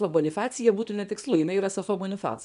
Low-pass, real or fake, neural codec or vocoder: 10.8 kHz; fake; codec, 24 kHz, 0.9 kbps, WavTokenizer, small release